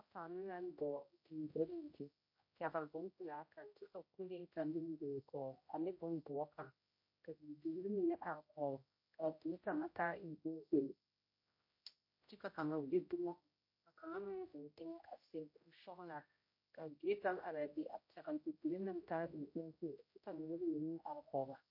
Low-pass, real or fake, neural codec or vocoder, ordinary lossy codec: 5.4 kHz; fake; codec, 16 kHz, 0.5 kbps, X-Codec, HuBERT features, trained on general audio; MP3, 32 kbps